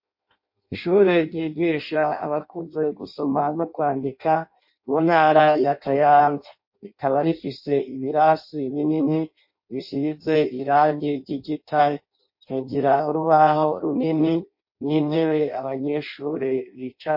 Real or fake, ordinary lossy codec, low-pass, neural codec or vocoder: fake; MP3, 32 kbps; 5.4 kHz; codec, 16 kHz in and 24 kHz out, 0.6 kbps, FireRedTTS-2 codec